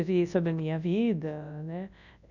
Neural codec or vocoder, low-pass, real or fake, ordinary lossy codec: codec, 24 kHz, 0.9 kbps, WavTokenizer, large speech release; 7.2 kHz; fake; none